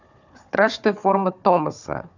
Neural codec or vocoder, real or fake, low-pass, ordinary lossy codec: codec, 16 kHz, 4 kbps, FunCodec, trained on Chinese and English, 50 frames a second; fake; 7.2 kHz; none